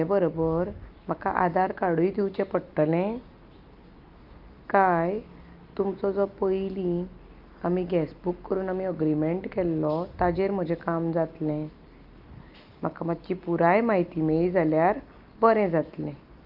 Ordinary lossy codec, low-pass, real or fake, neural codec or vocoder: Opus, 24 kbps; 5.4 kHz; real; none